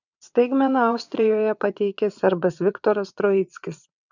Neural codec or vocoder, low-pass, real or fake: vocoder, 22.05 kHz, 80 mel bands, WaveNeXt; 7.2 kHz; fake